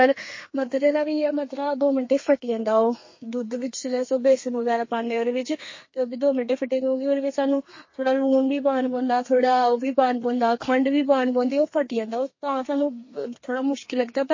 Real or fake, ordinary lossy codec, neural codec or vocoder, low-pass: fake; MP3, 32 kbps; codec, 32 kHz, 1.9 kbps, SNAC; 7.2 kHz